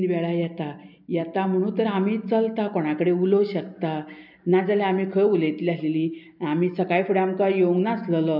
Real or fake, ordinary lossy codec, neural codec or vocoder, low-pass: real; none; none; 5.4 kHz